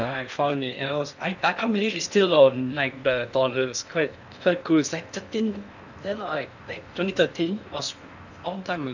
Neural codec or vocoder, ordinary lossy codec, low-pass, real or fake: codec, 16 kHz in and 24 kHz out, 0.8 kbps, FocalCodec, streaming, 65536 codes; none; 7.2 kHz; fake